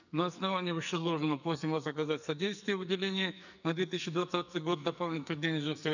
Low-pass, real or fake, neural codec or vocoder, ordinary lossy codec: 7.2 kHz; fake; codec, 44.1 kHz, 2.6 kbps, SNAC; none